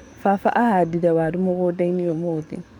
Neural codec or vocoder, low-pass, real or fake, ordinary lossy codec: codec, 44.1 kHz, 7.8 kbps, DAC; 19.8 kHz; fake; none